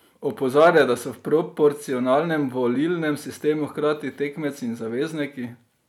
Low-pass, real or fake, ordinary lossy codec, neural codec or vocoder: 19.8 kHz; real; none; none